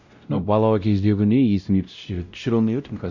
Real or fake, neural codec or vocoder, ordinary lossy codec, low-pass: fake; codec, 16 kHz, 0.5 kbps, X-Codec, WavLM features, trained on Multilingual LibriSpeech; none; 7.2 kHz